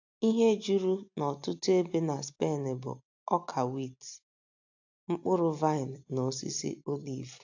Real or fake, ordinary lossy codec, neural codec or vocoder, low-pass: real; AAC, 48 kbps; none; 7.2 kHz